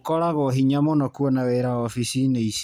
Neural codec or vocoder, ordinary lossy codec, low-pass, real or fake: none; none; 19.8 kHz; real